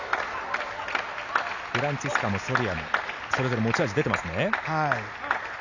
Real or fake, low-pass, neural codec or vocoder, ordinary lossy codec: real; 7.2 kHz; none; none